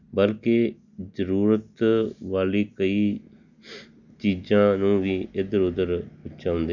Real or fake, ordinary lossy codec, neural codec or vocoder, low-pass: real; none; none; 7.2 kHz